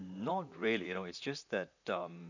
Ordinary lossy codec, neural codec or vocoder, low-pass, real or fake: none; vocoder, 44.1 kHz, 128 mel bands, Pupu-Vocoder; 7.2 kHz; fake